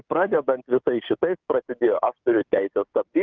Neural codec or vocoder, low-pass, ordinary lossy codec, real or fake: codec, 16 kHz, 6 kbps, DAC; 7.2 kHz; Opus, 32 kbps; fake